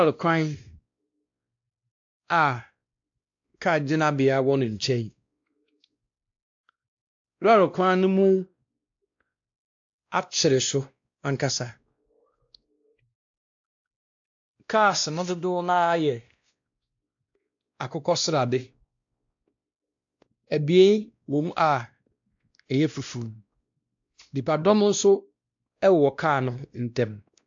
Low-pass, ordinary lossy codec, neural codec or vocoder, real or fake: 7.2 kHz; AAC, 64 kbps; codec, 16 kHz, 1 kbps, X-Codec, WavLM features, trained on Multilingual LibriSpeech; fake